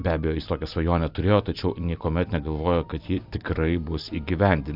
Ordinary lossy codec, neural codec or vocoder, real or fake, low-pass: AAC, 48 kbps; none; real; 5.4 kHz